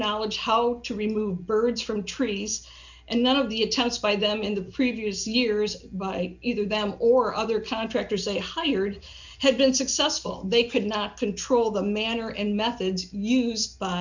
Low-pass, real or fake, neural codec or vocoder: 7.2 kHz; real; none